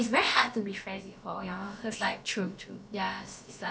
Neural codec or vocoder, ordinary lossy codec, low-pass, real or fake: codec, 16 kHz, about 1 kbps, DyCAST, with the encoder's durations; none; none; fake